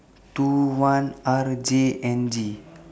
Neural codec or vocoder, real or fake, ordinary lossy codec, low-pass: none; real; none; none